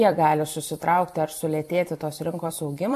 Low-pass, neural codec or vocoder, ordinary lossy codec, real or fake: 14.4 kHz; vocoder, 44.1 kHz, 128 mel bands every 256 samples, BigVGAN v2; MP3, 96 kbps; fake